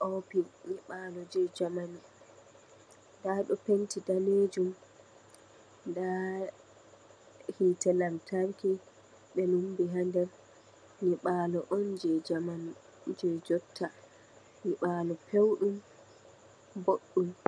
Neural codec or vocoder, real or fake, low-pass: none; real; 9.9 kHz